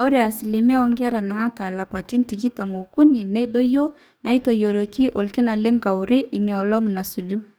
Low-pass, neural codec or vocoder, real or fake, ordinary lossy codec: none; codec, 44.1 kHz, 2.6 kbps, DAC; fake; none